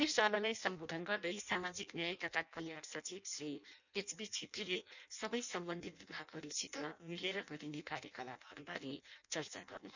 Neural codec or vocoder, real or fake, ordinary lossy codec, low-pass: codec, 16 kHz in and 24 kHz out, 0.6 kbps, FireRedTTS-2 codec; fake; none; 7.2 kHz